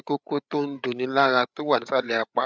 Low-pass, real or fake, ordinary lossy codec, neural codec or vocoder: none; fake; none; codec, 16 kHz, 8 kbps, FreqCodec, larger model